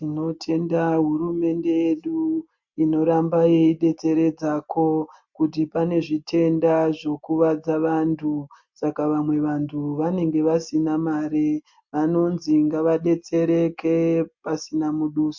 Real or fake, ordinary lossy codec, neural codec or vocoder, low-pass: real; MP3, 48 kbps; none; 7.2 kHz